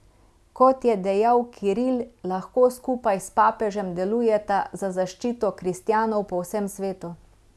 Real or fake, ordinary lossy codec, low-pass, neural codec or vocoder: real; none; none; none